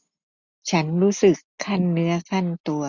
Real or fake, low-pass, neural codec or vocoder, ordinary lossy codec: real; 7.2 kHz; none; none